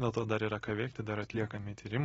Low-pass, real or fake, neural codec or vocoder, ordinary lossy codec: 19.8 kHz; real; none; AAC, 24 kbps